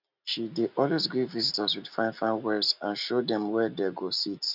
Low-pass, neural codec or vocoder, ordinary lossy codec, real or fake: 5.4 kHz; none; none; real